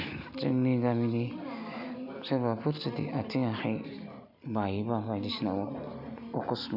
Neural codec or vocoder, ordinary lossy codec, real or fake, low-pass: none; none; real; 5.4 kHz